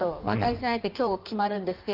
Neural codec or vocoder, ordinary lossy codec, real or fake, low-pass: codec, 16 kHz in and 24 kHz out, 1.1 kbps, FireRedTTS-2 codec; Opus, 32 kbps; fake; 5.4 kHz